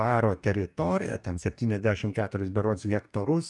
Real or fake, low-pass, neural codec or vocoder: fake; 10.8 kHz; codec, 44.1 kHz, 2.6 kbps, DAC